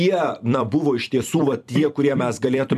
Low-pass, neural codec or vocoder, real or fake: 14.4 kHz; vocoder, 44.1 kHz, 128 mel bands every 256 samples, BigVGAN v2; fake